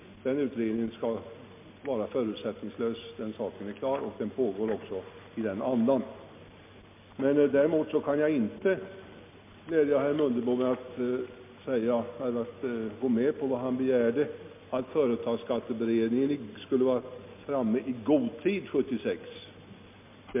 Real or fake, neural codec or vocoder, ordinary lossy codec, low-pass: real; none; MP3, 32 kbps; 3.6 kHz